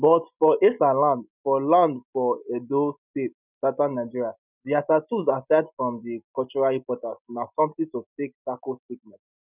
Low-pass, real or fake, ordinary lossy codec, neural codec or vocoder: 3.6 kHz; real; none; none